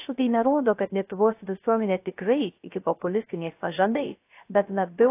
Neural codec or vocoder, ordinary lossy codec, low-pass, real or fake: codec, 16 kHz, 0.3 kbps, FocalCodec; AAC, 24 kbps; 3.6 kHz; fake